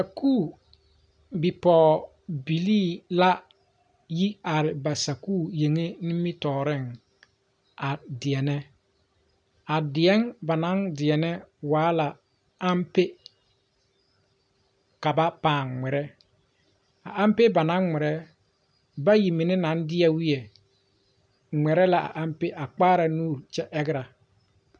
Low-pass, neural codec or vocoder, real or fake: 9.9 kHz; none; real